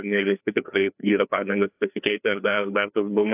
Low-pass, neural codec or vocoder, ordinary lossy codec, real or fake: 3.6 kHz; codec, 16 kHz, 2 kbps, FreqCodec, larger model; AAC, 32 kbps; fake